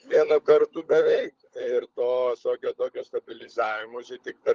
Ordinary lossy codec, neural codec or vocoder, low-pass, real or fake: Opus, 32 kbps; codec, 16 kHz, 16 kbps, FunCodec, trained on LibriTTS, 50 frames a second; 7.2 kHz; fake